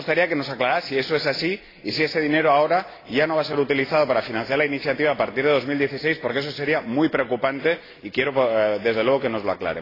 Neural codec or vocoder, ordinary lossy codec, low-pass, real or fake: none; AAC, 24 kbps; 5.4 kHz; real